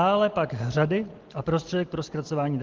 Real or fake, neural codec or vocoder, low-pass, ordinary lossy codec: real; none; 7.2 kHz; Opus, 16 kbps